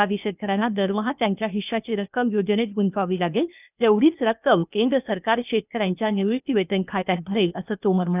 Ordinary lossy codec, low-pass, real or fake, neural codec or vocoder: none; 3.6 kHz; fake; codec, 16 kHz, 0.8 kbps, ZipCodec